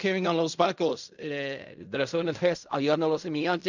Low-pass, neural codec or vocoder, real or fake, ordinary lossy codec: 7.2 kHz; codec, 16 kHz in and 24 kHz out, 0.4 kbps, LongCat-Audio-Codec, fine tuned four codebook decoder; fake; none